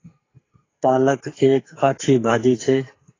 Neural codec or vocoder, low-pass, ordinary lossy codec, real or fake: codec, 44.1 kHz, 2.6 kbps, SNAC; 7.2 kHz; AAC, 32 kbps; fake